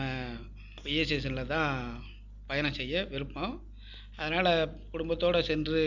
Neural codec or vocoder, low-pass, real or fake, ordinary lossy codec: none; 7.2 kHz; real; none